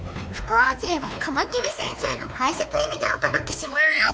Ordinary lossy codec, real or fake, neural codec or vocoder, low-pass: none; fake; codec, 16 kHz, 2 kbps, X-Codec, WavLM features, trained on Multilingual LibriSpeech; none